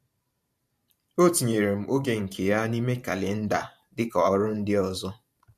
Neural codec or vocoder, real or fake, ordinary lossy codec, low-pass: vocoder, 44.1 kHz, 128 mel bands every 512 samples, BigVGAN v2; fake; MP3, 64 kbps; 14.4 kHz